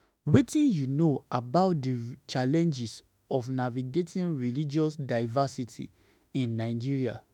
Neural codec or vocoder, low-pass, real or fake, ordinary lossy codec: autoencoder, 48 kHz, 32 numbers a frame, DAC-VAE, trained on Japanese speech; 19.8 kHz; fake; none